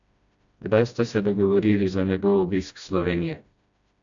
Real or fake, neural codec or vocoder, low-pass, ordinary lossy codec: fake; codec, 16 kHz, 1 kbps, FreqCodec, smaller model; 7.2 kHz; AAC, 64 kbps